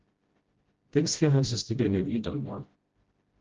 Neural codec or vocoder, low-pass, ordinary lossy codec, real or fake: codec, 16 kHz, 0.5 kbps, FreqCodec, smaller model; 7.2 kHz; Opus, 16 kbps; fake